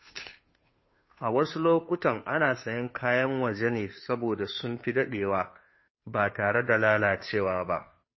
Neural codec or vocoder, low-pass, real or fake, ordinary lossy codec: codec, 16 kHz, 2 kbps, FunCodec, trained on Chinese and English, 25 frames a second; 7.2 kHz; fake; MP3, 24 kbps